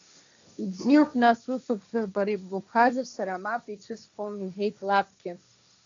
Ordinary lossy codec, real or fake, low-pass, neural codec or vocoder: AAC, 64 kbps; fake; 7.2 kHz; codec, 16 kHz, 1.1 kbps, Voila-Tokenizer